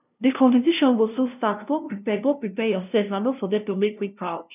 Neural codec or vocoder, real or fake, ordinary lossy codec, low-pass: codec, 16 kHz, 0.5 kbps, FunCodec, trained on LibriTTS, 25 frames a second; fake; none; 3.6 kHz